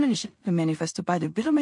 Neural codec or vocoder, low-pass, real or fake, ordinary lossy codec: codec, 16 kHz in and 24 kHz out, 0.4 kbps, LongCat-Audio-Codec, two codebook decoder; 10.8 kHz; fake; MP3, 48 kbps